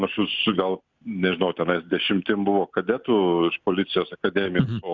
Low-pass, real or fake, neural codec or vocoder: 7.2 kHz; real; none